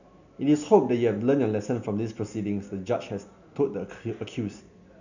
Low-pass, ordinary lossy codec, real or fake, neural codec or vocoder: 7.2 kHz; none; real; none